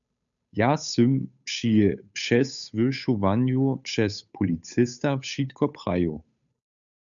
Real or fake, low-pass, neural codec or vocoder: fake; 7.2 kHz; codec, 16 kHz, 8 kbps, FunCodec, trained on Chinese and English, 25 frames a second